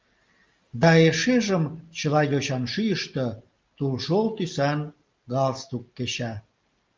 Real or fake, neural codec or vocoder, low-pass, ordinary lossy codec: real; none; 7.2 kHz; Opus, 32 kbps